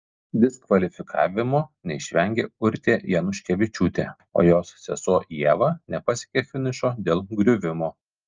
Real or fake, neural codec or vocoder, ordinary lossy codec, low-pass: real; none; Opus, 24 kbps; 7.2 kHz